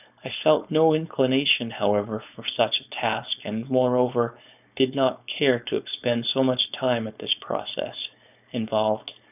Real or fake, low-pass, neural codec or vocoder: fake; 3.6 kHz; codec, 16 kHz, 4.8 kbps, FACodec